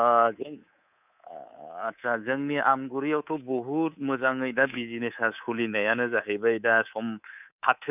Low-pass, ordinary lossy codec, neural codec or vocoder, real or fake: 3.6 kHz; none; codec, 24 kHz, 3.1 kbps, DualCodec; fake